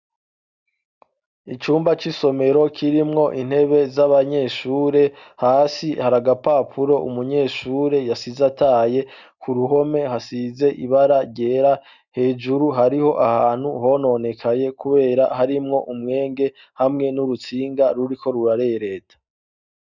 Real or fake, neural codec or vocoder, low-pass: real; none; 7.2 kHz